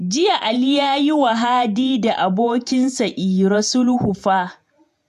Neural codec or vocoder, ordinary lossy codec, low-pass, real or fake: vocoder, 48 kHz, 128 mel bands, Vocos; AAC, 96 kbps; 14.4 kHz; fake